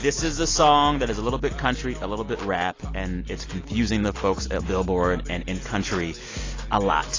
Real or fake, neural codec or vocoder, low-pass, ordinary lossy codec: real; none; 7.2 kHz; AAC, 32 kbps